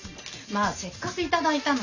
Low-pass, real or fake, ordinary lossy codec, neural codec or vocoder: 7.2 kHz; real; none; none